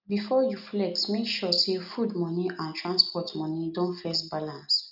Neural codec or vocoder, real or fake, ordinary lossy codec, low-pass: none; real; none; 5.4 kHz